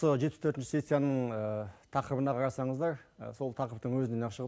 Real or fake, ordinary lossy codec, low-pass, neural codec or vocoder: real; none; none; none